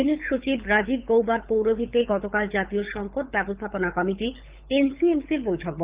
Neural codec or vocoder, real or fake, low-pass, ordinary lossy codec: codec, 24 kHz, 6 kbps, HILCodec; fake; 3.6 kHz; Opus, 32 kbps